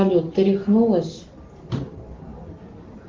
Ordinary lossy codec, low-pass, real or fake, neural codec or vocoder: Opus, 16 kbps; 7.2 kHz; real; none